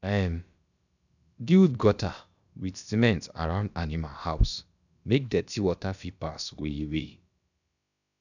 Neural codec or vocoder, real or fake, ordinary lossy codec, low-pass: codec, 16 kHz, about 1 kbps, DyCAST, with the encoder's durations; fake; none; 7.2 kHz